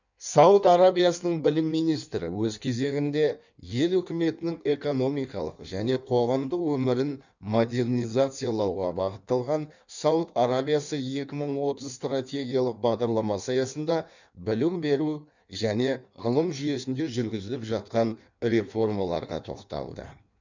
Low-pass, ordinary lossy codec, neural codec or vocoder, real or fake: 7.2 kHz; none; codec, 16 kHz in and 24 kHz out, 1.1 kbps, FireRedTTS-2 codec; fake